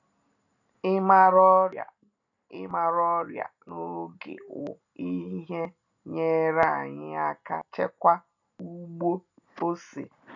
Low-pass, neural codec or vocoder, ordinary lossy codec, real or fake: 7.2 kHz; none; none; real